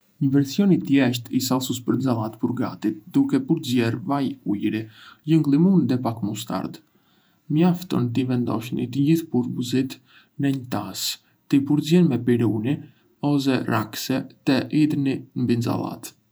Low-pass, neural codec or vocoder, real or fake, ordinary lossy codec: none; vocoder, 48 kHz, 128 mel bands, Vocos; fake; none